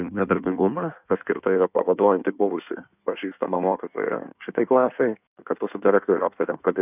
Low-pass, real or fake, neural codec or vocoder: 3.6 kHz; fake; codec, 16 kHz in and 24 kHz out, 1.1 kbps, FireRedTTS-2 codec